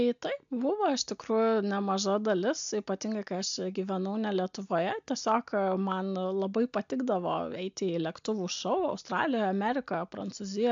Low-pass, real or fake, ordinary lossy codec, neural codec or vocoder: 7.2 kHz; real; MP3, 64 kbps; none